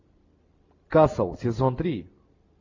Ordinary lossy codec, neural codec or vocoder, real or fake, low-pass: AAC, 48 kbps; none; real; 7.2 kHz